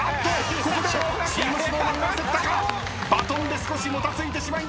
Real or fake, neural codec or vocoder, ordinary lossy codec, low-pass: real; none; none; none